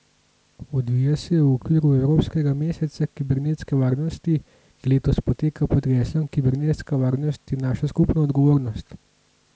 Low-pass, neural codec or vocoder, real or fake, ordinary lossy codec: none; none; real; none